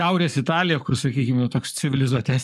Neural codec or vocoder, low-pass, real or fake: codec, 44.1 kHz, 7.8 kbps, Pupu-Codec; 14.4 kHz; fake